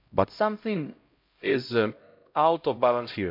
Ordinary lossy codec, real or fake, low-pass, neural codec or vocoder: MP3, 48 kbps; fake; 5.4 kHz; codec, 16 kHz, 0.5 kbps, X-Codec, HuBERT features, trained on LibriSpeech